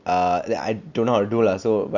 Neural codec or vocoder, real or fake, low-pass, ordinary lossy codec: none; real; 7.2 kHz; none